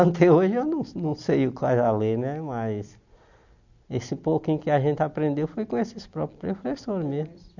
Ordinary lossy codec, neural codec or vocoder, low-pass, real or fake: none; none; 7.2 kHz; real